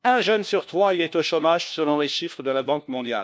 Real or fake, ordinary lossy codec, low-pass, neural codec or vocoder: fake; none; none; codec, 16 kHz, 1 kbps, FunCodec, trained on LibriTTS, 50 frames a second